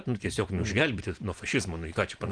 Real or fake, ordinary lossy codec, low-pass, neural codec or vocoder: real; Opus, 24 kbps; 9.9 kHz; none